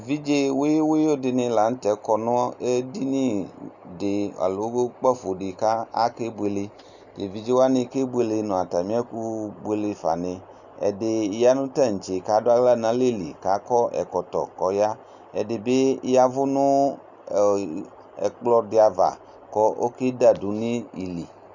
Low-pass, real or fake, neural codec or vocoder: 7.2 kHz; real; none